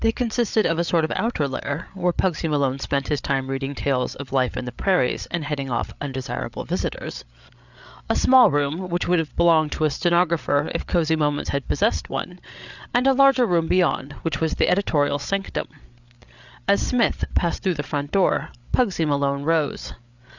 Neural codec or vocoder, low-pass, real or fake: codec, 16 kHz, 8 kbps, FreqCodec, larger model; 7.2 kHz; fake